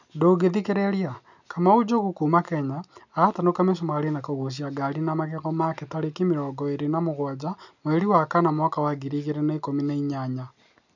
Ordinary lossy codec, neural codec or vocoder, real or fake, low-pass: none; none; real; 7.2 kHz